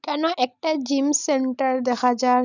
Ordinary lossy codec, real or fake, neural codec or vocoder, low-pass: none; real; none; 7.2 kHz